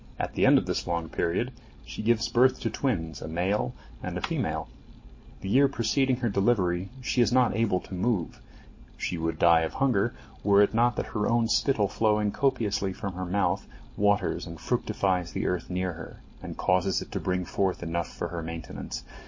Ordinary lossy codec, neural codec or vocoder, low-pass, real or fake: MP3, 32 kbps; none; 7.2 kHz; real